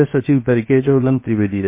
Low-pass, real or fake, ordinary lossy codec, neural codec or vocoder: 3.6 kHz; fake; MP3, 24 kbps; codec, 16 kHz, 0.7 kbps, FocalCodec